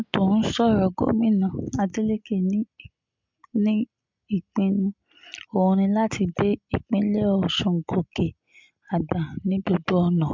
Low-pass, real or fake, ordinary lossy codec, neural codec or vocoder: 7.2 kHz; real; MP3, 64 kbps; none